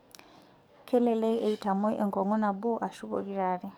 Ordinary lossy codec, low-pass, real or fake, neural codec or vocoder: none; none; fake; codec, 44.1 kHz, 7.8 kbps, DAC